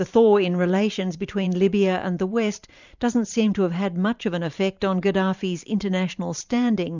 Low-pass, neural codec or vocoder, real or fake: 7.2 kHz; vocoder, 44.1 kHz, 128 mel bands every 512 samples, BigVGAN v2; fake